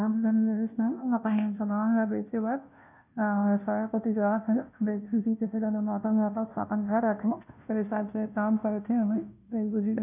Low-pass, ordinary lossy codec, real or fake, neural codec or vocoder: 3.6 kHz; none; fake; codec, 16 kHz, 0.5 kbps, FunCodec, trained on Chinese and English, 25 frames a second